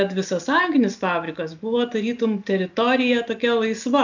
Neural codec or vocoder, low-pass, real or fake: none; 7.2 kHz; real